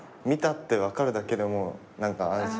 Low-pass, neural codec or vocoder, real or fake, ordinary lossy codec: none; none; real; none